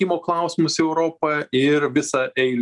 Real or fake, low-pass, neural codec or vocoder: real; 10.8 kHz; none